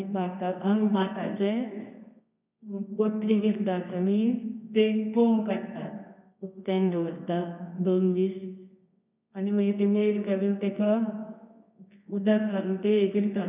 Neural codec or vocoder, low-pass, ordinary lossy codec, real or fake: codec, 24 kHz, 0.9 kbps, WavTokenizer, medium music audio release; 3.6 kHz; none; fake